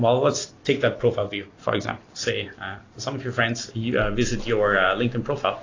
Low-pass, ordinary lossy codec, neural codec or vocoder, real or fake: 7.2 kHz; AAC, 32 kbps; none; real